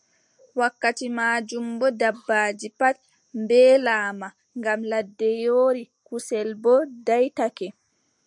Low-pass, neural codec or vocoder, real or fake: 10.8 kHz; none; real